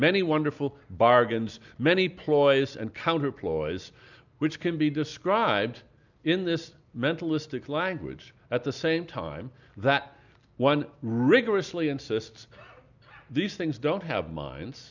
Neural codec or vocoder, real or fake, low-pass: none; real; 7.2 kHz